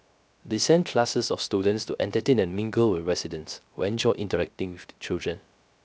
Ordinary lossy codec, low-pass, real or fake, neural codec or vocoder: none; none; fake; codec, 16 kHz, 0.3 kbps, FocalCodec